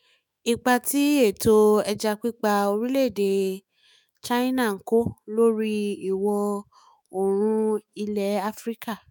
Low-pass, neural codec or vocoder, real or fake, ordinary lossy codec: none; autoencoder, 48 kHz, 128 numbers a frame, DAC-VAE, trained on Japanese speech; fake; none